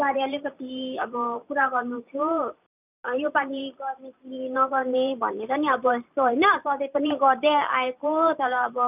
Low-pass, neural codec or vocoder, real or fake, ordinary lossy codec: 3.6 kHz; vocoder, 44.1 kHz, 128 mel bands every 512 samples, BigVGAN v2; fake; none